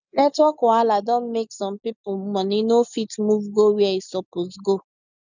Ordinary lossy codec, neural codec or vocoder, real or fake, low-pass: none; none; real; 7.2 kHz